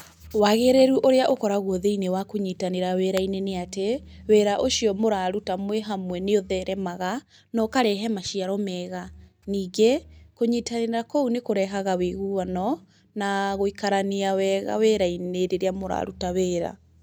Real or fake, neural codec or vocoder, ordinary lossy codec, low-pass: real; none; none; none